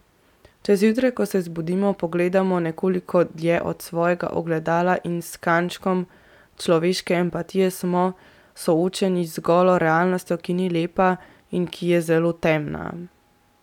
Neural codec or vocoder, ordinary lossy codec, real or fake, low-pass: none; none; real; 19.8 kHz